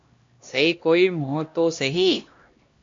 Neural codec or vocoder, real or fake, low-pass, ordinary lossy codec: codec, 16 kHz, 1 kbps, X-Codec, HuBERT features, trained on LibriSpeech; fake; 7.2 kHz; MP3, 48 kbps